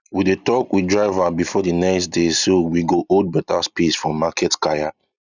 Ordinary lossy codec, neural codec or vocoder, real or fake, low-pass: none; none; real; 7.2 kHz